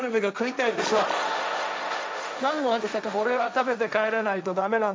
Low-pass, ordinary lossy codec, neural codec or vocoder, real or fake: none; none; codec, 16 kHz, 1.1 kbps, Voila-Tokenizer; fake